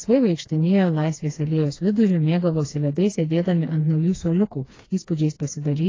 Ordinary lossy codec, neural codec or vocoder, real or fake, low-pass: AAC, 32 kbps; codec, 16 kHz, 2 kbps, FreqCodec, smaller model; fake; 7.2 kHz